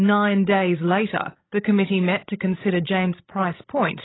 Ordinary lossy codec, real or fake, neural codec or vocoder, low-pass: AAC, 16 kbps; fake; codec, 16 kHz, 16 kbps, FreqCodec, larger model; 7.2 kHz